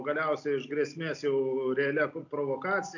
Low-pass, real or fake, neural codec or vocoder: 7.2 kHz; real; none